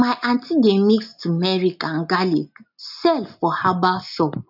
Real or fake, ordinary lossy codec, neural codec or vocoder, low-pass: real; none; none; 5.4 kHz